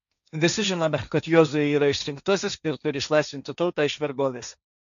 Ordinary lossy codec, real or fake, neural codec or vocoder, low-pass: AAC, 96 kbps; fake; codec, 16 kHz, 1.1 kbps, Voila-Tokenizer; 7.2 kHz